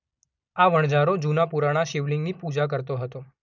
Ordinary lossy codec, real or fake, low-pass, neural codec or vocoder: none; real; 7.2 kHz; none